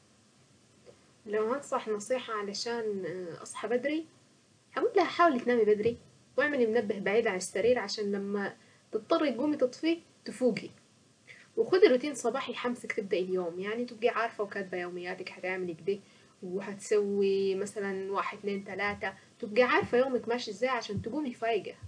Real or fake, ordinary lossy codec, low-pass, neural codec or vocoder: real; none; 9.9 kHz; none